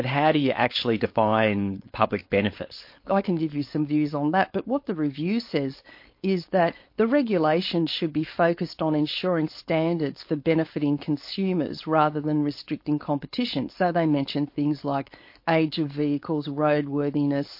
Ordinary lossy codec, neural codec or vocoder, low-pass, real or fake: MP3, 32 kbps; codec, 16 kHz, 4.8 kbps, FACodec; 5.4 kHz; fake